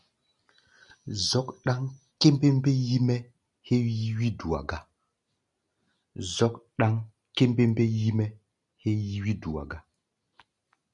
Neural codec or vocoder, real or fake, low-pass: none; real; 10.8 kHz